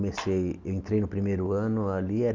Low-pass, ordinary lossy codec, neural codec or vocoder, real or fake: 7.2 kHz; Opus, 32 kbps; none; real